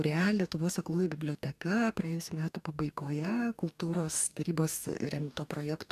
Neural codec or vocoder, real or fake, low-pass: codec, 44.1 kHz, 2.6 kbps, DAC; fake; 14.4 kHz